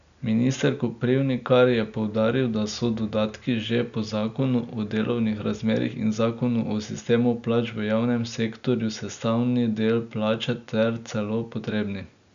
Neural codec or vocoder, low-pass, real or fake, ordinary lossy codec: none; 7.2 kHz; real; none